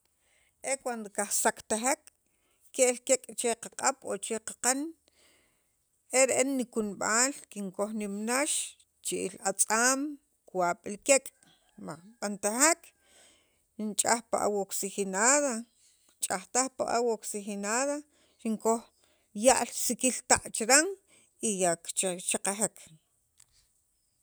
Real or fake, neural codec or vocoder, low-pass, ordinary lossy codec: real; none; none; none